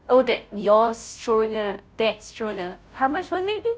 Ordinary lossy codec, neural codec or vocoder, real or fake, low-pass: none; codec, 16 kHz, 0.5 kbps, FunCodec, trained on Chinese and English, 25 frames a second; fake; none